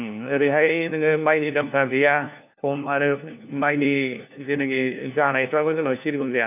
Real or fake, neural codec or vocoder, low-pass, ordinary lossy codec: fake; codec, 16 kHz, 1 kbps, FunCodec, trained on LibriTTS, 50 frames a second; 3.6 kHz; none